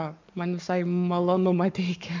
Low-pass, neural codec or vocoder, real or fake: 7.2 kHz; codec, 44.1 kHz, 7.8 kbps, Pupu-Codec; fake